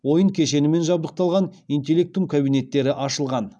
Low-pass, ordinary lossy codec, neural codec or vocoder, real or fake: none; none; none; real